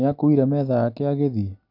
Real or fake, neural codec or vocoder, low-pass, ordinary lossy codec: real; none; 5.4 kHz; none